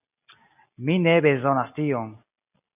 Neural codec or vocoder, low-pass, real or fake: none; 3.6 kHz; real